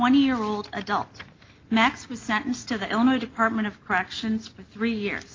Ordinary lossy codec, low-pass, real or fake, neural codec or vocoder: Opus, 32 kbps; 7.2 kHz; real; none